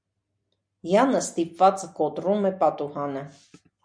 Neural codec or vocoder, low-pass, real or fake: none; 9.9 kHz; real